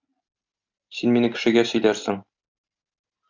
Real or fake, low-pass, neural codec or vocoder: real; 7.2 kHz; none